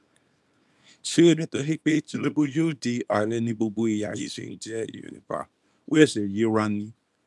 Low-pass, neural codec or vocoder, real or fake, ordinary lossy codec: none; codec, 24 kHz, 0.9 kbps, WavTokenizer, small release; fake; none